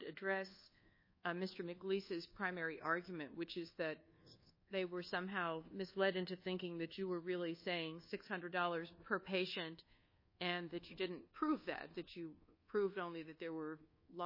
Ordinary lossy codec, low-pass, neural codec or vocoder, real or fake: MP3, 24 kbps; 5.4 kHz; codec, 24 kHz, 1.2 kbps, DualCodec; fake